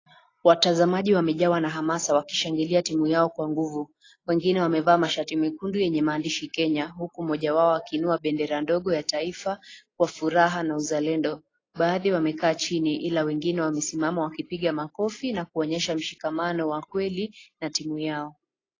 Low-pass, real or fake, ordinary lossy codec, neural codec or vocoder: 7.2 kHz; real; AAC, 32 kbps; none